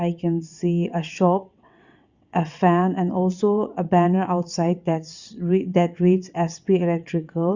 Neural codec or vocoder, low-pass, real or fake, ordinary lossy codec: vocoder, 22.05 kHz, 80 mel bands, WaveNeXt; 7.2 kHz; fake; Opus, 64 kbps